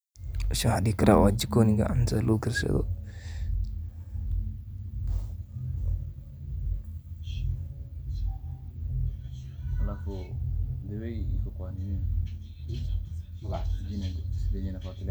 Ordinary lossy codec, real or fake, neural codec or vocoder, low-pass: none; real; none; none